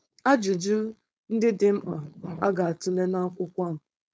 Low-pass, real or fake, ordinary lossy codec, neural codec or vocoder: none; fake; none; codec, 16 kHz, 4.8 kbps, FACodec